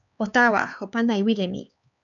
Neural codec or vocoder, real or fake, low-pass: codec, 16 kHz, 4 kbps, X-Codec, HuBERT features, trained on LibriSpeech; fake; 7.2 kHz